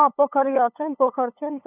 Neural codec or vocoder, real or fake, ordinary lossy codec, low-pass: codec, 16 kHz, 8 kbps, FunCodec, trained on LibriTTS, 25 frames a second; fake; none; 3.6 kHz